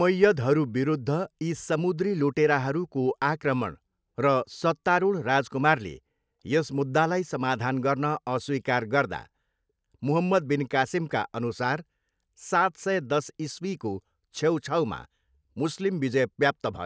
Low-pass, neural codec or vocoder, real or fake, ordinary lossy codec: none; none; real; none